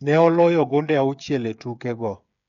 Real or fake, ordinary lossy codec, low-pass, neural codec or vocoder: fake; none; 7.2 kHz; codec, 16 kHz, 8 kbps, FreqCodec, smaller model